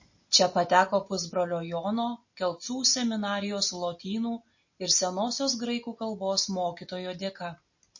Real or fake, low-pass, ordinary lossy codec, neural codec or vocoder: real; 7.2 kHz; MP3, 32 kbps; none